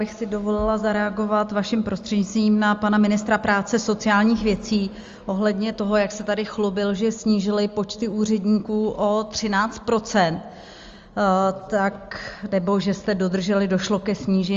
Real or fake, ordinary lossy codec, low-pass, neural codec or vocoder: real; Opus, 32 kbps; 7.2 kHz; none